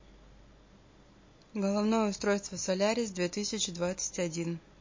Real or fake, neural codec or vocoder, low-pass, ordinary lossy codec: real; none; 7.2 kHz; MP3, 32 kbps